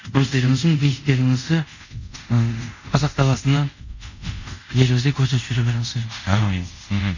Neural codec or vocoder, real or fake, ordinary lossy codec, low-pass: codec, 24 kHz, 0.5 kbps, DualCodec; fake; none; 7.2 kHz